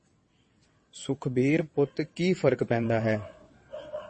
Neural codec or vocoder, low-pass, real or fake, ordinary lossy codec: vocoder, 22.05 kHz, 80 mel bands, WaveNeXt; 9.9 kHz; fake; MP3, 32 kbps